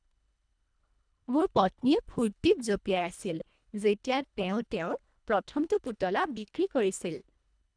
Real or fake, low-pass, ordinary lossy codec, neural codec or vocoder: fake; 9.9 kHz; none; codec, 24 kHz, 1.5 kbps, HILCodec